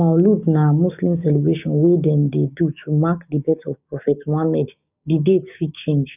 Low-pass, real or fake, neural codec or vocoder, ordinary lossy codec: 3.6 kHz; fake; codec, 16 kHz, 6 kbps, DAC; none